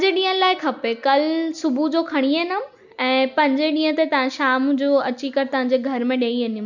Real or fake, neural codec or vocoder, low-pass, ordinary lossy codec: real; none; 7.2 kHz; none